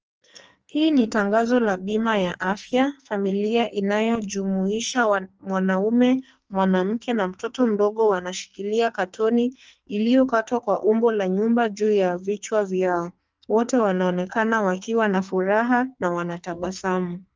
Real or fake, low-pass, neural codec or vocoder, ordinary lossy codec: fake; 7.2 kHz; codec, 44.1 kHz, 2.6 kbps, SNAC; Opus, 24 kbps